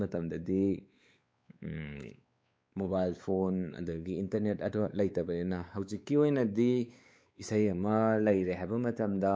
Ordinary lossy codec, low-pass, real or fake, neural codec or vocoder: none; none; fake; codec, 16 kHz, 4 kbps, X-Codec, WavLM features, trained on Multilingual LibriSpeech